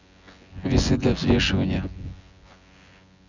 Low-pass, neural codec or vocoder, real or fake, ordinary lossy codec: 7.2 kHz; vocoder, 24 kHz, 100 mel bands, Vocos; fake; none